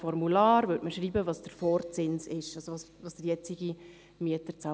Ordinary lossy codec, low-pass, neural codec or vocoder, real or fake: none; none; none; real